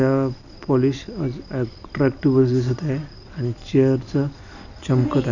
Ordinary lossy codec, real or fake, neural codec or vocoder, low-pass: none; real; none; 7.2 kHz